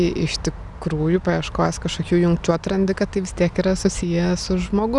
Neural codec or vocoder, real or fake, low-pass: none; real; 10.8 kHz